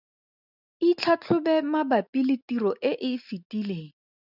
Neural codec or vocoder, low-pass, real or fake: none; 5.4 kHz; real